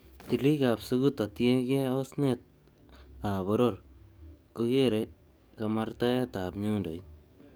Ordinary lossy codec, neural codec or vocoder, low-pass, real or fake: none; codec, 44.1 kHz, 7.8 kbps, DAC; none; fake